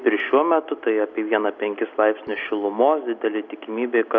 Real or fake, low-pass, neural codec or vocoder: real; 7.2 kHz; none